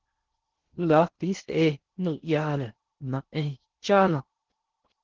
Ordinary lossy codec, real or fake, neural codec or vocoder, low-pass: Opus, 16 kbps; fake; codec, 16 kHz in and 24 kHz out, 0.6 kbps, FocalCodec, streaming, 2048 codes; 7.2 kHz